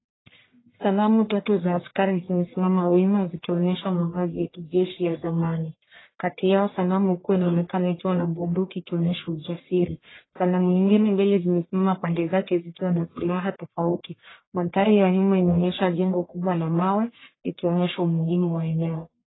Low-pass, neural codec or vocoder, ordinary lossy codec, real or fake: 7.2 kHz; codec, 44.1 kHz, 1.7 kbps, Pupu-Codec; AAC, 16 kbps; fake